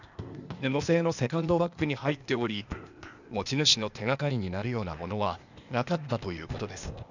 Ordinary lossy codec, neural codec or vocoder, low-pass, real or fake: none; codec, 16 kHz, 0.8 kbps, ZipCodec; 7.2 kHz; fake